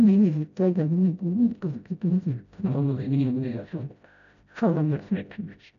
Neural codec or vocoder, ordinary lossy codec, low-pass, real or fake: codec, 16 kHz, 0.5 kbps, FreqCodec, smaller model; none; 7.2 kHz; fake